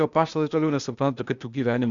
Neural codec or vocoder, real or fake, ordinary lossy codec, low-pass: codec, 16 kHz, 0.8 kbps, ZipCodec; fake; Opus, 64 kbps; 7.2 kHz